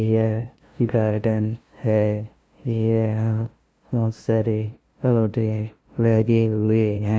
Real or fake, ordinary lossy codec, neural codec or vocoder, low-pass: fake; none; codec, 16 kHz, 0.5 kbps, FunCodec, trained on LibriTTS, 25 frames a second; none